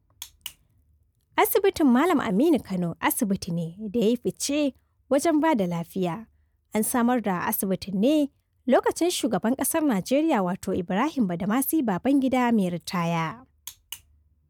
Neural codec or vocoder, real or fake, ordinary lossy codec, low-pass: none; real; none; none